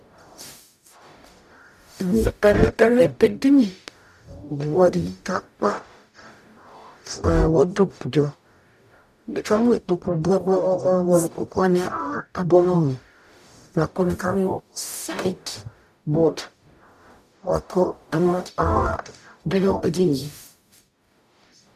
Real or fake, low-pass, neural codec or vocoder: fake; 14.4 kHz; codec, 44.1 kHz, 0.9 kbps, DAC